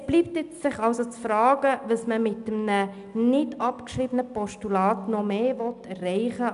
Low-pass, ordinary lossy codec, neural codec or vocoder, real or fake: 10.8 kHz; none; none; real